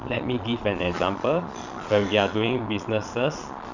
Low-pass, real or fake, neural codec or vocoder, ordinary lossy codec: 7.2 kHz; fake; vocoder, 22.05 kHz, 80 mel bands, Vocos; none